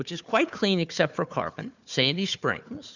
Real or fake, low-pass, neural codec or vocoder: fake; 7.2 kHz; codec, 16 kHz, 4 kbps, FunCodec, trained on Chinese and English, 50 frames a second